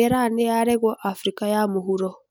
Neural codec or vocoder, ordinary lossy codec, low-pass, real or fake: none; none; none; real